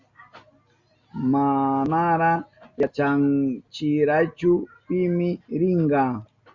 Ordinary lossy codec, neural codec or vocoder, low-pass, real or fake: Opus, 64 kbps; none; 7.2 kHz; real